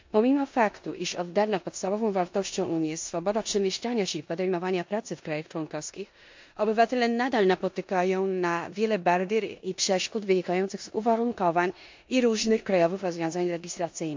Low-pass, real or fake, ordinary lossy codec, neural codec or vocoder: 7.2 kHz; fake; MP3, 48 kbps; codec, 16 kHz in and 24 kHz out, 0.9 kbps, LongCat-Audio-Codec, four codebook decoder